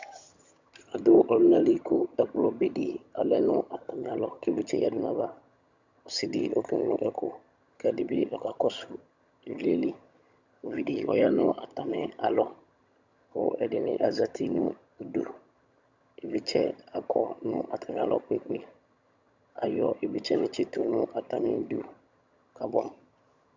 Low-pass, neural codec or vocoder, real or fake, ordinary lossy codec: 7.2 kHz; vocoder, 22.05 kHz, 80 mel bands, HiFi-GAN; fake; Opus, 64 kbps